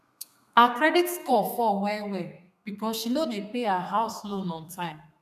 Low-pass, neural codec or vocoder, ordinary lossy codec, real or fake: 14.4 kHz; codec, 32 kHz, 1.9 kbps, SNAC; none; fake